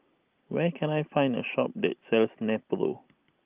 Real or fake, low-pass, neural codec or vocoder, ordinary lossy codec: real; 3.6 kHz; none; Opus, 32 kbps